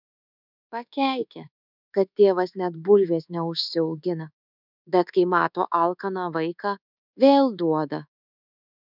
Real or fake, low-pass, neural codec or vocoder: fake; 5.4 kHz; codec, 24 kHz, 1.2 kbps, DualCodec